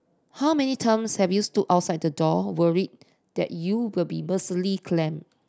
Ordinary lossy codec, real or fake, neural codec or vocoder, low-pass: none; real; none; none